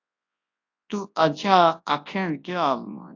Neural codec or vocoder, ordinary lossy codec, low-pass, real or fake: codec, 24 kHz, 0.9 kbps, WavTokenizer, large speech release; AAC, 48 kbps; 7.2 kHz; fake